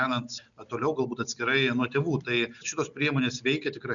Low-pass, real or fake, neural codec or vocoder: 7.2 kHz; real; none